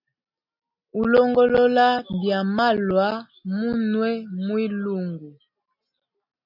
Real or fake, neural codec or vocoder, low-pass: real; none; 5.4 kHz